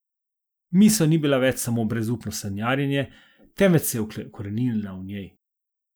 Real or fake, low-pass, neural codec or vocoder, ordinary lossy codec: real; none; none; none